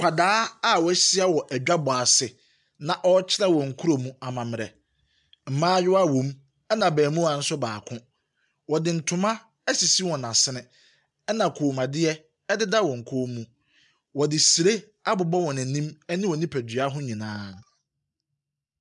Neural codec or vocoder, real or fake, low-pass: none; real; 10.8 kHz